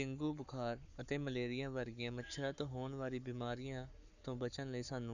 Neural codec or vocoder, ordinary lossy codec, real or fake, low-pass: codec, 44.1 kHz, 7.8 kbps, Pupu-Codec; none; fake; 7.2 kHz